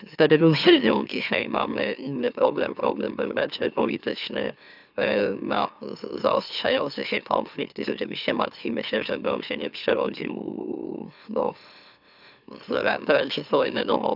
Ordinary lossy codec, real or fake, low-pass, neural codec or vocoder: none; fake; 5.4 kHz; autoencoder, 44.1 kHz, a latent of 192 numbers a frame, MeloTTS